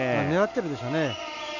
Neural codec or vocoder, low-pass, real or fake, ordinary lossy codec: none; 7.2 kHz; real; none